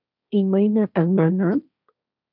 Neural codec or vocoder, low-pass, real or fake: codec, 16 kHz, 1.1 kbps, Voila-Tokenizer; 5.4 kHz; fake